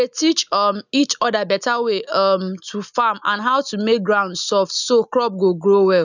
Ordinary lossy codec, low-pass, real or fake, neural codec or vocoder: none; 7.2 kHz; real; none